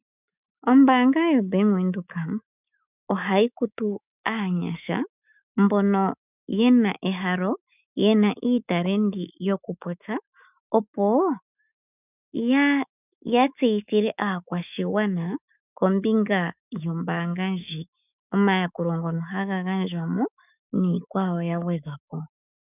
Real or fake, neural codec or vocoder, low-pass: fake; autoencoder, 48 kHz, 128 numbers a frame, DAC-VAE, trained on Japanese speech; 3.6 kHz